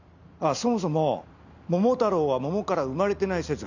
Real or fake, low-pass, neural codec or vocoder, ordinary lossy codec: real; 7.2 kHz; none; none